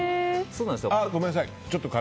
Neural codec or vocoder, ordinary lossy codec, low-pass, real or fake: none; none; none; real